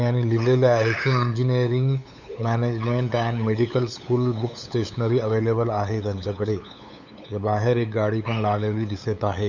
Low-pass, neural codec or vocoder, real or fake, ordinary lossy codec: 7.2 kHz; codec, 16 kHz, 8 kbps, FunCodec, trained on LibriTTS, 25 frames a second; fake; none